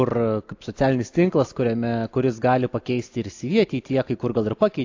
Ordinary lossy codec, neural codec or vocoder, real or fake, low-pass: AAC, 48 kbps; none; real; 7.2 kHz